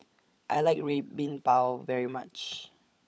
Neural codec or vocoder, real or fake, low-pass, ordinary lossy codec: codec, 16 kHz, 16 kbps, FunCodec, trained on LibriTTS, 50 frames a second; fake; none; none